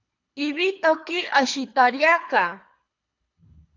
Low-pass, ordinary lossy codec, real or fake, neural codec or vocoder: 7.2 kHz; AAC, 48 kbps; fake; codec, 24 kHz, 3 kbps, HILCodec